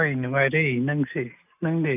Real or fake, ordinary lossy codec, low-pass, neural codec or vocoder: real; none; 3.6 kHz; none